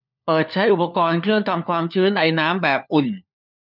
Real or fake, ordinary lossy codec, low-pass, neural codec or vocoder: fake; none; 5.4 kHz; codec, 16 kHz, 4 kbps, FunCodec, trained on LibriTTS, 50 frames a second